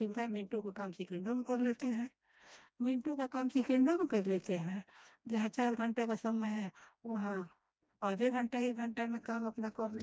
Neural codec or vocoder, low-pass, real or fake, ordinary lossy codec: codec, 16 kHz, 1 kbps, FreqCodec, smaller model; none; fake; none